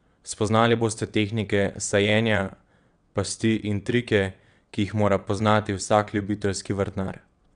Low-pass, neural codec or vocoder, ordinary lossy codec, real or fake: 9.9 kHz; vocoder, 22.05 kHz, 80 mel bands, WaveNeXt; none; fake